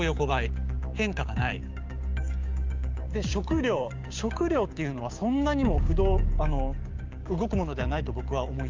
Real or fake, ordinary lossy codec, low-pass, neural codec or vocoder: fake; Opus, 32 kbps; 7.2 kHz; codec, 16 kHz, 6 kbps, DAC